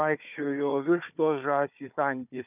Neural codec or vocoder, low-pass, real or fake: codec, 16 kHz, 4 kbps, FunCodec, trained on Chinese and English, 50 frames a second; 3.6 kHz; fake